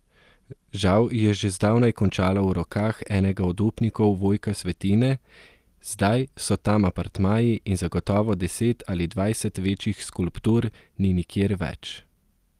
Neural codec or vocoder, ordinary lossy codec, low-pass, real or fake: none; Opus, 24 kbps; 14.4 kHz; real